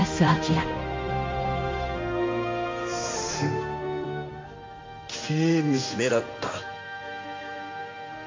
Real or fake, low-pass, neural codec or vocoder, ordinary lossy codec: fake; 7.2 kHz; codec, 16 kHz in and 24 kHz out, 1 kbps, XY-Tokenizer; MP3, 48 kbps